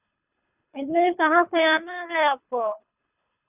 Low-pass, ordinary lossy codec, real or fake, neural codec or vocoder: 3.6 kHz; none; fake; codec, 24 kHz, 3 kbps, HILCodec